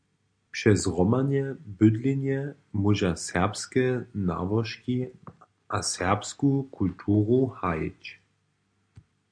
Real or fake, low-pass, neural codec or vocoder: real; 9.9 kHz; none